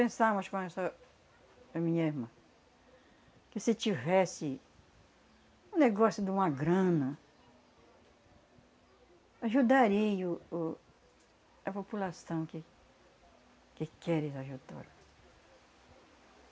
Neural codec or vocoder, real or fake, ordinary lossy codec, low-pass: none; real; none; none